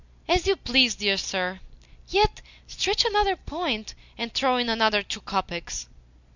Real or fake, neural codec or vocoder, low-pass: real; none; 7.2 kHz